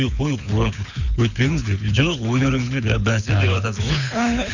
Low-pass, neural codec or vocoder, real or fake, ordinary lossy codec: 7.2 kHz; codec, 24 kHz, 6 kbps, HILCodec; fake; none